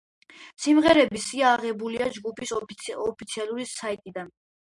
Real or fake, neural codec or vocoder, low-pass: real; none; 10.8 kHz